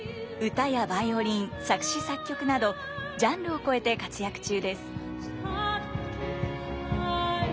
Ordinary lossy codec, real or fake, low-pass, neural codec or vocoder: none; real; none; none